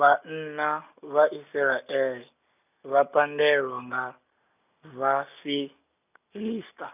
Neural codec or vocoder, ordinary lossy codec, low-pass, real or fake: none; none; 3.6 kHz; real